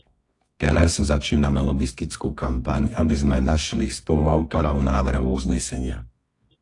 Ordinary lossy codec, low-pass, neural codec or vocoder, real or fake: AAC, 48 kbps; 10.8 kHz; codec, 24 kHz, 0.9 kbps, WavTokenizer, medium music audio release; fake